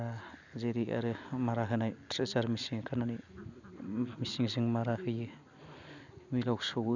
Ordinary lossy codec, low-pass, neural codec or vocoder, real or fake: none; 7.2 kHz; none; real